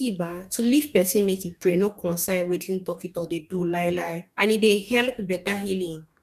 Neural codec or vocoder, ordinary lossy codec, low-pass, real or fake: codec, 44.1 kHz, 2.6 kbps, DAC; none; 14.4 kHz; fake